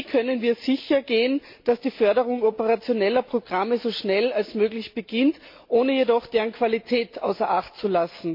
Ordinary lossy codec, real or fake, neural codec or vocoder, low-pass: MP3, 32 kbps; real; none; 5.4 kHz